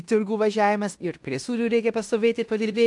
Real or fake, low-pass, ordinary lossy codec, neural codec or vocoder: fake; 10.8 kHz; AAC, 64 kbps; codec, 16 kHz in and 24 kHz out, 0.9 kbps, LongCat-Audio-Codec, fine tuned four codebook decoder